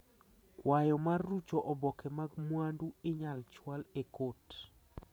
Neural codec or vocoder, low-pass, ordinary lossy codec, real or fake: vocoder, 44.1 kHz, 128 mel bands every 512 samples, BigVGAN v2; none; none; fake